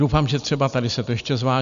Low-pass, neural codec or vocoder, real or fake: 7.2 kHz; none; real